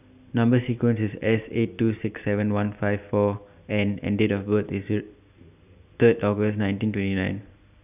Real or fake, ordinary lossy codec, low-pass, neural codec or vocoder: real; none; 3.6 kHz; none